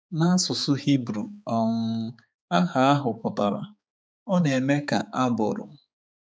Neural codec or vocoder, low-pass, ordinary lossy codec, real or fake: codec, 16 kHz, 4 kbps, X-Codec, HuBERT features, trained on balanced general audio; none; none; fake